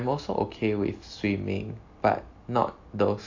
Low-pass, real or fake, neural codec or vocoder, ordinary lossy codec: 7.2 kHz; real; none; AAC, 48 kbps